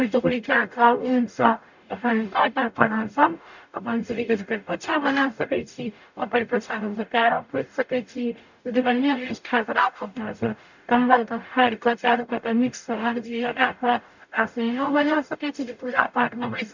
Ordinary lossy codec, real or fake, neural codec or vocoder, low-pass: none; fake; codec, 44.1 kHz, 0.9 kbps, DAC; 7.2 kHz